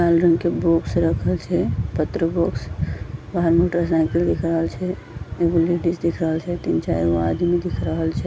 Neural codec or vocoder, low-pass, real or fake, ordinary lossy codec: none; none; real; none